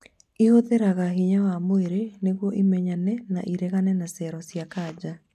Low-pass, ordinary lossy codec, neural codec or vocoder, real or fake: 14.4 kHz; none; none; real